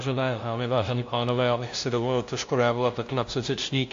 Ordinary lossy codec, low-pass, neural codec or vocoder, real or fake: AAC, 48 kbps; 7.2 kHz; codec, 16 kHz, 0.5 kbps, FunCodec, trained on LibriTTS, 25 frames a second; fake